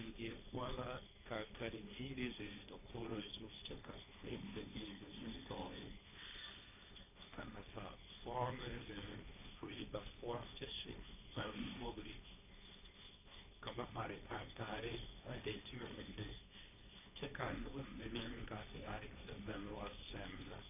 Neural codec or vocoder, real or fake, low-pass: codec, 16 kHz, 1.1 kbps, Voila-Tokenizer; fake; 3.6 kHz